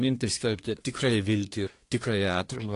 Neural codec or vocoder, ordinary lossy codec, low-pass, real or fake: codec, 24 kHz, 1 kbps, SNAC; AAC, 48 kbps; 10.8 kHz; fake